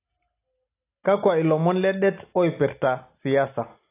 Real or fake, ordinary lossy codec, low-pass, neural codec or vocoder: real; AAC, 24 kbps; 3.6 kHz; none